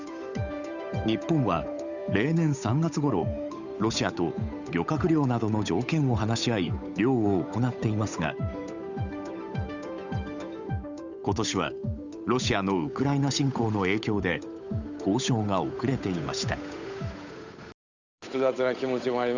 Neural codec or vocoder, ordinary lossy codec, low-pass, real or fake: codec, 16 kHz, 8 kbps, FunCodec, trained on Chinese and English, 25 frames a second; none; 7.2 kHz; fake